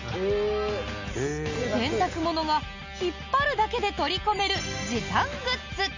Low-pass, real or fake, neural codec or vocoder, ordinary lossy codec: 7.2 kHz; real; none; MP3, 64 kbps